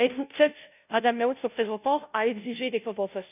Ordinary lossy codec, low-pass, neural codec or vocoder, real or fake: none; 3.6 kHz; codec, 16 kHz, 0.5 kbps, FunCodec, trained on Chinese and English, 25 frames a second; fake